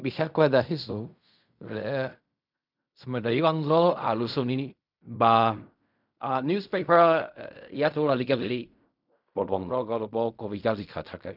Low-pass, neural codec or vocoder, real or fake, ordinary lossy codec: 5.4 kHz; codec, 16 kHz in and 24 kHz out, 0.4 kbps, LongCat-Audio-Codec, fine tuned four codebook decoder; fake; none